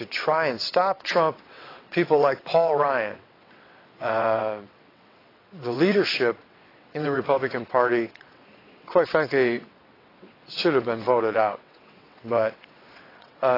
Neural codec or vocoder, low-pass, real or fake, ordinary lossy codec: vocoder, 22.05 kHz, 80 mel bands, WaveNeXt; 5.4 kHz; fake; AAC, 24 kbps